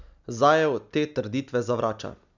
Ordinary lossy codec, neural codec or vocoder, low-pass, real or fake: none; none; 7.2 kHz; real